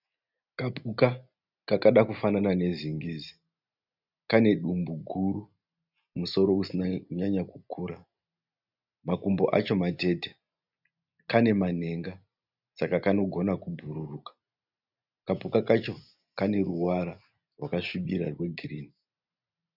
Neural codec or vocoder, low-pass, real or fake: none; 5.4 kHz; real